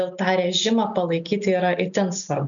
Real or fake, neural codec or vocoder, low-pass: real; none; 7.2 kHz